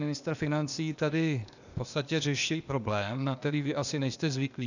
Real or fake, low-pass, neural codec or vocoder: fake; 7.2 kHz; codec, 16 kHz, 0.8 kbps, ZipCodec